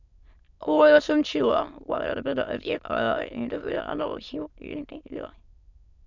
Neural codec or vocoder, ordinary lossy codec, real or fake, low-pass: autoencoder, 22.05 kHz, a latent of 192 numbers a frame, VITS, trained on many speakers; none; fake; 7.2 kHz